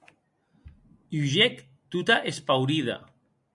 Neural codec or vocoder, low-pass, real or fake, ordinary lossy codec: none; 10.8 kHz; real; MP3, 64 kbps